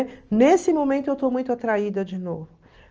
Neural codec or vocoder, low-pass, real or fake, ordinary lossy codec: none; 7.2 kHz; real; Opus, 24 kbps